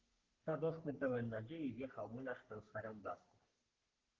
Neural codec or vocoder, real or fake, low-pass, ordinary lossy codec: codec, 44.1 kHz, 3.4 kbps, Pupu-Codec; fake; 7.2 kHz; Opus, 16 kbps